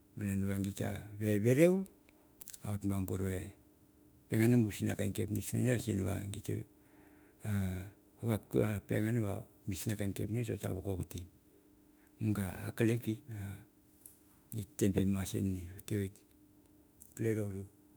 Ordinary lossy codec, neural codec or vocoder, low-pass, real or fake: none; autoencoder, 48 kHz, 32 numbers a frame, DAC-VAE, trained on Japanese speech; none; fake